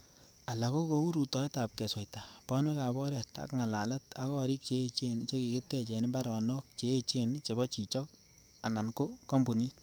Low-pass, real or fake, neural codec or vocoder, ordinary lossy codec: none; fake; codec, 44.1 kHz, 7.8 kbps, DAC; none